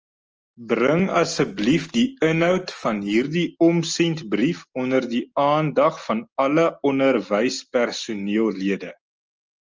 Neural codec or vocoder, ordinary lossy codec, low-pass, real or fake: none; Opus, 24 kbps; 7.2 kHz; real